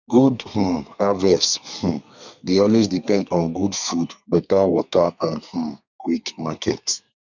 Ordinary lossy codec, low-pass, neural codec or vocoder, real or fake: none; 7.2 kHz; codec, 32 kHz, 1.9 kbps, SNAC; fake